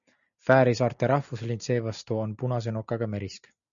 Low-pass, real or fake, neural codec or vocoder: 7.2 kHz; real; none